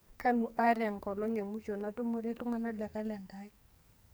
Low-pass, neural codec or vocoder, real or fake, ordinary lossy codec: none; codec, 44.1 kHz, 2.6 kbps, SNAC; fake; none